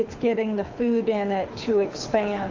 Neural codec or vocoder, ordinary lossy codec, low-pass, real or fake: codec, 24 kHz, 6 kbps, HILCodec; AAC, 48 kbps; 7.2 kHz; fake